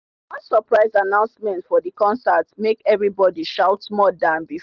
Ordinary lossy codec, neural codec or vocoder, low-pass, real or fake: Opus, 32 kbps; none; 7.2 kHz; real